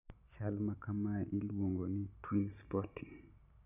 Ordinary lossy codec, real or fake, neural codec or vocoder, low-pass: none; real; none; 3.6 kHz